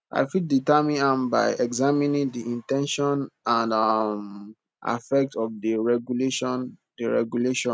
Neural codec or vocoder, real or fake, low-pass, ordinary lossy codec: none; real; none; none